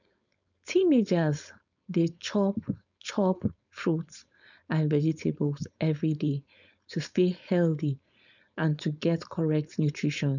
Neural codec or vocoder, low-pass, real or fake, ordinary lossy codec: codec, 16 kHz, 4.8 kbps, FACodec; 7.2 kHz; fake; none